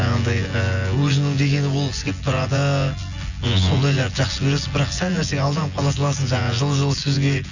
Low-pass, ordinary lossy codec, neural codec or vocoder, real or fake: 7.2 kHz; none; vocoder, 24 kHz, 100 mel bands, Vocos; fake